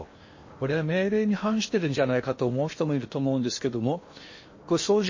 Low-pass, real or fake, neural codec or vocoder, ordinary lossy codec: 7.2 kHz; fake; codec, 16 kHz in and 24 kHz out, 0.8 kbps, FocalCodec, streaming, 65536 codes; MP3, 32 kbps